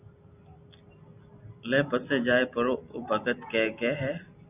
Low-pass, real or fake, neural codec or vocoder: 3.6 kHz; real; none